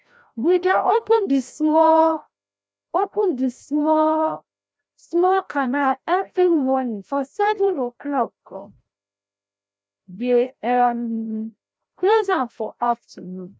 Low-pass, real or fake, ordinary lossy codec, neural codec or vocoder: none; fake; none; codec, 16 kHz, 0.5 kbps, FreqCodec, larger model